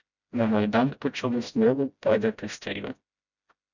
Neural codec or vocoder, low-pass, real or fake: codec, 16 kHz, 0.5 kbps, FreqCodec, smaller model; 7.2 kHz; fake